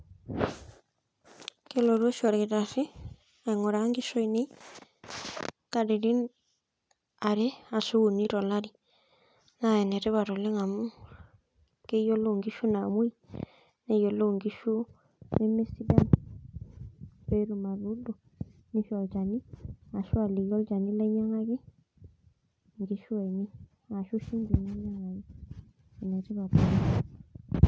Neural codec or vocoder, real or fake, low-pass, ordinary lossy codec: none; real; none; none